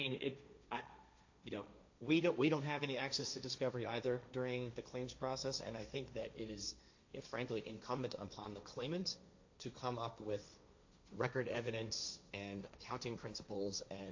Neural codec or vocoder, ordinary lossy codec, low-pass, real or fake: codec, 16 kHz, 1.1 kbps, Voila-Tokenizer; AAC, 48 kbps; 7.2 kHz; fake